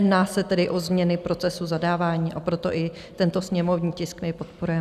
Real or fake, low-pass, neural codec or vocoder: real; 14.4 kHz; none